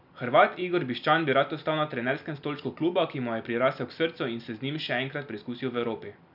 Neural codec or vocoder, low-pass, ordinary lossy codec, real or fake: none; 5.4 kHz; none; real